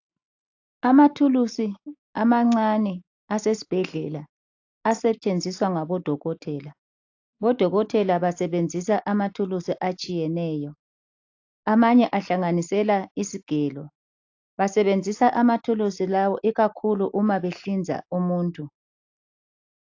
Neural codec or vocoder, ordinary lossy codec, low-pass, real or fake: none; AAC, 48 kbps; 7.2 kHz; real